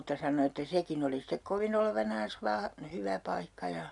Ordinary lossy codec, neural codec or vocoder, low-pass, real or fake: none; none; 10.8 kHz; real